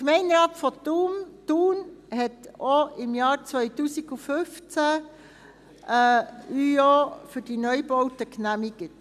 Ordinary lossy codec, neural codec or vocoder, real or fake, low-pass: none; none; real; 14.4 kHz